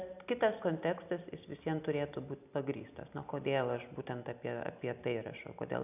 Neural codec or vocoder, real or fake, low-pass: none; real; 3.6 kHz